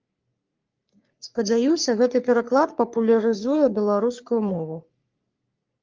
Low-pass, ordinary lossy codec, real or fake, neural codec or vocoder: 7.2 kHz; Opus, 24 kbps; fake; codec, 44.1 kHz, 3.4 kbps, Pupu-Codec